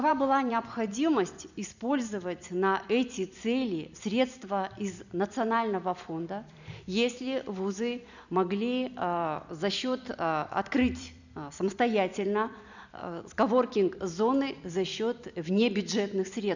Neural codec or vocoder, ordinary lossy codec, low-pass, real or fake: vocoder, 44.1 kHz, 128 mel bands every 256 samples, BigVGAN v2; none; 7.2 kHz; fake